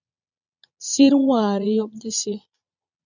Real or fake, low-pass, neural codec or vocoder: fake; 7.2 kHz; vocoder, 22.05 kHz, 80 mel bands, Vocos